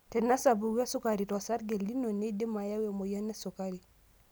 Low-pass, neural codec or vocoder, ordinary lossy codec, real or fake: none; none; none; real